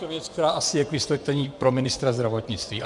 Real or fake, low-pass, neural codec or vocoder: fake; 10.8 kHz; vocoder, 24 kHz, 100 mel bands, Vocos